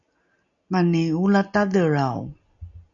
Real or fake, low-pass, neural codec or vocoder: real; 7.2 kHz; none